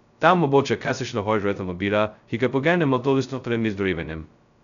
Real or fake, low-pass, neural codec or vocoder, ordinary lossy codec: fake; 7.2 kHz; codec, 16 kHz, 0.2 kbps, FocalCodec; none